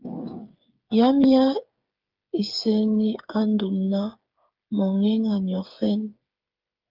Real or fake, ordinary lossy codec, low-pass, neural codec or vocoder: fake; Opus, 24 kbps; 5.4 kHz; codec, 16 kHz, 8 kbps, FreqCodec, smaller model